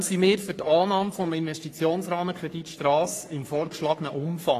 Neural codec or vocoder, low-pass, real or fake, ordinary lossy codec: codec, 44.1 kHz, 3.4 kbps, Pupu-Codec; 14.4 kHz; fake; AAC, 48 kbps